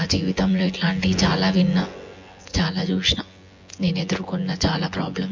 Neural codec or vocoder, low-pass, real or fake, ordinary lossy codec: vocoder, 24 kHz, 100 mel bands, Vocos; 7.2 kHz; fake; MP3, 48 kbps